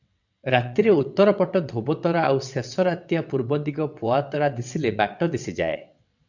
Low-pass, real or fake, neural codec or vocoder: 7.2 kHz; fake; vocoder, 22.05 kHz, 80 mel bands, WaveNeXt